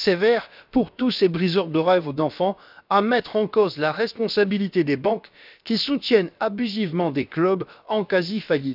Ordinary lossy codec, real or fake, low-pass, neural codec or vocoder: none; fake; 5.4 kHz; codec, 16 kHz, about 1 kbps, DyCAST, with the encoder's durations